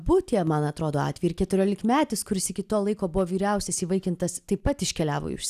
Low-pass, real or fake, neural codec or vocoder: 14.4 kHz; real; none